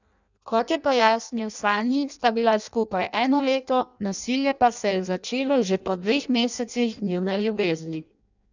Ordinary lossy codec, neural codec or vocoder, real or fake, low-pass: none; codec, 16 kHz in and 24 kHz out, 0.6 kbps, FireRedTTS-2 codec; fake; 7.2 kHz